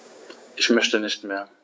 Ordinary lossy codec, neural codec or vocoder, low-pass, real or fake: none; codec, 16 kHz, 6 kbps, DAC; none; fake